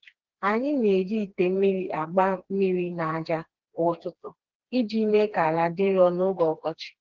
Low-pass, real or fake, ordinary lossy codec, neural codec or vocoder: 7.2 kHz; fake; Opus, 16 kbps; codec, 16 kHz, 2 kbps, FreqCodec, smaller model